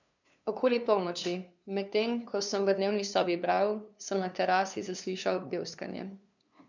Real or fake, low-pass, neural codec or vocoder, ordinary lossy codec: fake; 7.2 kHz; codec, 16 kHz, 2 kbps, FunCodec, trained on LibriTTS, 25 frames a second; none